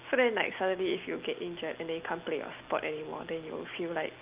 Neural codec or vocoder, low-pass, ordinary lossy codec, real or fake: none; 3.6 kHz; Opus, 32 kbps; real